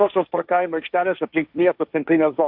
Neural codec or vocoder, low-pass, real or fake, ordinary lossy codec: codec, 16 kHz, 1.1 kbps, Voila-Tokenizer; 5.4 kHz; fake; AAC, 48 kbps